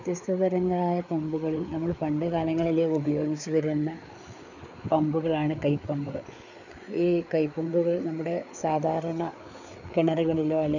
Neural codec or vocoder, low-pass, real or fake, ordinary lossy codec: codec, 16 kHz, 4 kbps, FreqCodec, larger model; 7.2 kHz; fake; none